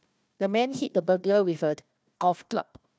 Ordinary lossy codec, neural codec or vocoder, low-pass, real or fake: none; codec, 16 kHz, 1 kbps, FunCodec, trained on Chinese and English, 50 frames a second; none; fake